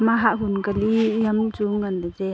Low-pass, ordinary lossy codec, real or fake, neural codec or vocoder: none; none; real; none